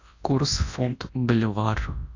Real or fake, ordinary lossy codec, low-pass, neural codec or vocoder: fake; AAC, 48 kbps; 7.2 kHz; codec, 24 kHz, 0.9 kbps, WavTokenizer, large speech release